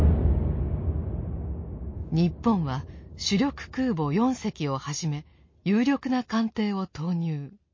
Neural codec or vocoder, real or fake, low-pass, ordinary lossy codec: none; real; 7.2 kHz; MP3, 32 kbps